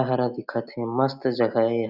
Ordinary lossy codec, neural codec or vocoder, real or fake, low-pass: none; none; real; 5.4 kHz